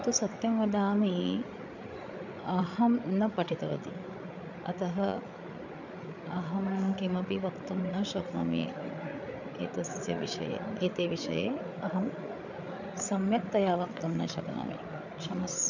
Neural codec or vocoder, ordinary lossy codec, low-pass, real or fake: codec, 16 kHz, 8 kbps, FreqCodec, larger model; none; 7.2 kHz; fake